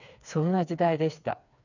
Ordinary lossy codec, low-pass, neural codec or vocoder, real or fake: none; 7.2 kHz; codec, 16 kHz, 4 kbps, FreqCodec, smaller model; fake